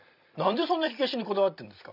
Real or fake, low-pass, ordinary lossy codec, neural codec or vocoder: fake; 5.4 kHz; none; vocoder, 44.1 kHz, 128 mel bands every 256 samples, BigVGAN v2